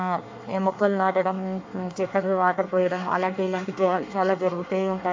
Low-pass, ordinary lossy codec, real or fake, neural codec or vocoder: 7.2 kHz; MP3, 48 kbps; fake; codec, 24 kHz, 1 kbps, SNAC